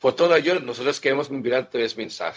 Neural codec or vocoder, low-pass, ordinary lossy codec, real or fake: codec, 16 kHz, 0.4 kbps, LongCat-Audio-Codec; none; none; fake